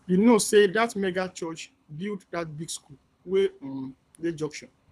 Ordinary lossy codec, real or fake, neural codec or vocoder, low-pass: none; fake; codec, 24 kHz, 6 kbps, HILCodec; none